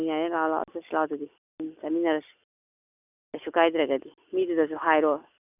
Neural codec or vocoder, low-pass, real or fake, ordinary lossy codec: none; 3.6 kHz; real; none